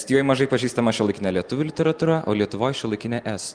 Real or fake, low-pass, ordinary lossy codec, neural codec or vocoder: real; 9.9 kHz; Opus, 24 kbps; none